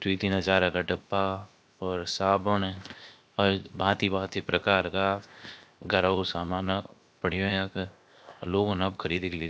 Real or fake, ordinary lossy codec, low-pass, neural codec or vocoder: fake; none; none; codec, 16 kHz, 0.7 kbps, FocalCodec